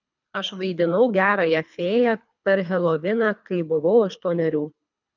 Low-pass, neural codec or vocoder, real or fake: 7.2 kHz; codec, 24 kHz, 3 kbps, HILCodec; fake